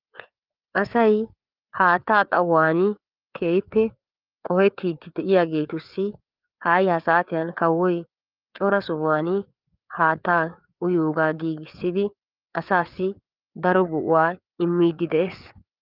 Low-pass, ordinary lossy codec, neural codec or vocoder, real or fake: 5.4 kHz; Opus, 24 kbps; codec, 16 kHz, 4 kbps, FreqCodec, larger model; fake